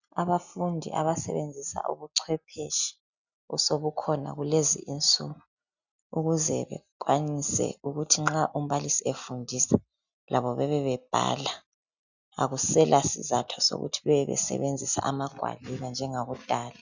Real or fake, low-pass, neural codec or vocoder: real; 7.2 kHz; none